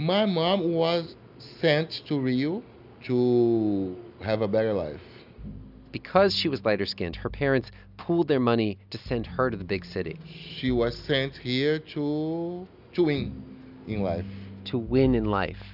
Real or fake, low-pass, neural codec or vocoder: real; 5.4 kHz; none